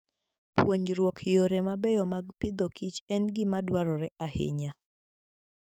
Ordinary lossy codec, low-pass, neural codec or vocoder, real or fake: none; 19.8 kHz; codec, 44.1 kHz, 7.8 kbps, DAC; fake